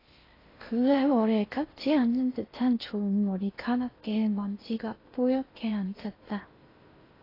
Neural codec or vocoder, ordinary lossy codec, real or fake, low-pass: codec, 16 kHz in and 24 kHz out, 0.6 kbps, FocalCodec, streaming, 2048 codes; AAC, 24 kbps; fake; 5.4 kHz